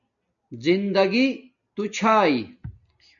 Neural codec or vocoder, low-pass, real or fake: none; 7.2 kHz; real